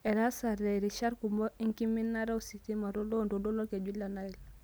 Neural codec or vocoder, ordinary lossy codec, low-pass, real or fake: none; none; none; real